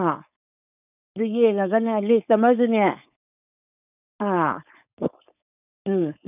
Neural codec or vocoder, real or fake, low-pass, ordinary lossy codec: codec, 16 kHz, 4.8 kbps, FACodec; fake; 3.6 kHz; none